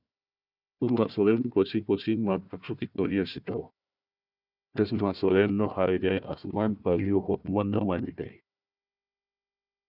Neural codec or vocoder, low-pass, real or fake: codec, 16 kHz, 1 kbps, FunCodec, trained on Chinese and English, 50 frames a second; 5.4 kHz; fake